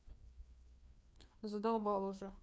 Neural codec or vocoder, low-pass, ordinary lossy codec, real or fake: codec, 16 kHz, 2 kbps, FreqCodec, larger model; none; none; fake